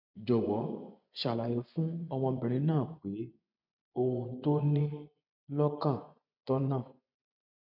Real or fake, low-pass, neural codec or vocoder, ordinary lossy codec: fake; 5.4 kHz; vocoder, 24 kHz, 100 mel bands, Vocos; none